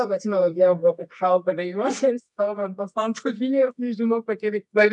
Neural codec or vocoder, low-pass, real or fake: codec, 24 kHz, 0.9 kbps, WavTokenizer, medium music audio release; 10.8 kHz; fake